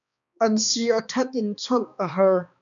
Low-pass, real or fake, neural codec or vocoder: 7.2 kHz; fake; codec, 16 kHz, 2 kbps, X-Codec, HuBERT features, trained on balanced general audio